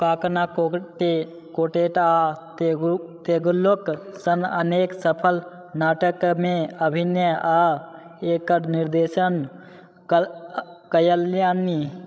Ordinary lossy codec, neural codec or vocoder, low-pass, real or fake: none; codec, 16 kHz, 16 kbps, FreqCodec, larger model; none; fake